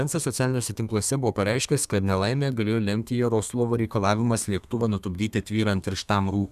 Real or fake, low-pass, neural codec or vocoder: fake; 14.4 kHz; codec, 32 kHz, 1.9 kbps, SNAC